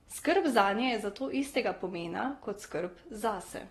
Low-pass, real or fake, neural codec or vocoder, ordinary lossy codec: 19.8 kHz; real; none; AAC, 32 kbps